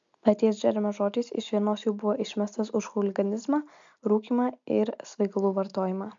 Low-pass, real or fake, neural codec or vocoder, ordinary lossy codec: 7.2 kHz; real; none; MP3, 64 kbps